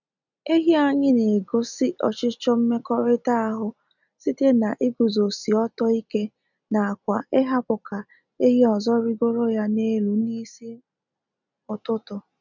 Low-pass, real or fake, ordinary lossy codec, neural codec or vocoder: 7.2 kHz; real; none; none